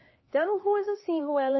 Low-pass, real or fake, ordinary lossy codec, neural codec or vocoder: 7.2 kHz; fake; MP3, 24 kbps; codec, 16 kHz, 2 kbps, X-Codec, HuBERT features, trained on LibriSpeech